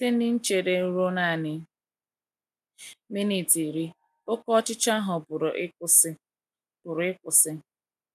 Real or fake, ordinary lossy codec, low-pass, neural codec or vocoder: real; none; 14.4 kHz; none